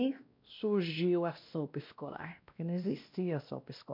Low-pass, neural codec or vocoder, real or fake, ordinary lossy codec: 5.4 kHz; codec, 16 kHz, 1 kbps, X-Codec, WavLM features, trained on Multilingual LibriSpeech; fake; MP3, 48 kbps